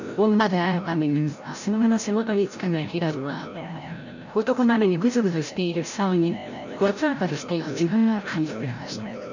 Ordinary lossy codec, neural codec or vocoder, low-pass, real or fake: none; codec, 16 kHz, 0.5 kbps, FreqCodec, larger model; 7.2 kHz; fake